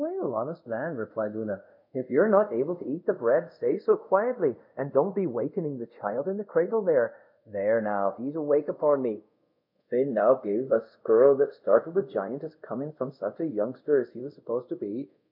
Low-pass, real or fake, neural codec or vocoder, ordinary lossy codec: 5.4 kHz; fake; codec, 24 kHz, 0.5 kbps, DualCodec; MP3, 48 kbps